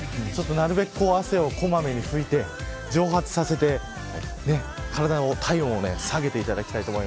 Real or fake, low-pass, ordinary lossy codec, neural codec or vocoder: real; none; none; none